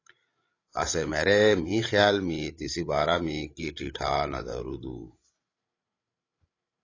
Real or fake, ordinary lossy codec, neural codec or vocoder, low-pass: fake; AAC, 32 kbps; codec, 16 kHz, 16 kbps, FreqCodec, larger model; 7.2 kHz